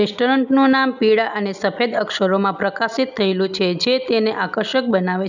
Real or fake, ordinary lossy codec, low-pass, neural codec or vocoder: real; none; 7.2 kHz; none